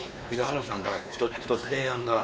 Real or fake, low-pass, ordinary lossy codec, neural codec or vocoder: fake; none; none; codec, 16 kHz, 2 kbps, X-Codec, WavLM features, trained on Multilingual LibriSpeech